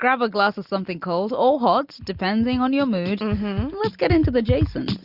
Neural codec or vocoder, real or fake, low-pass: none; real; 5.4 kHz